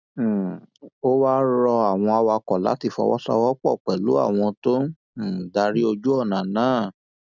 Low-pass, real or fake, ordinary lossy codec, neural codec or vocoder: 7.2 kHz; real; none; none